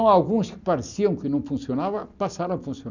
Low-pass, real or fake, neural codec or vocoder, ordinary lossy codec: 7.2 kHz; real; none; none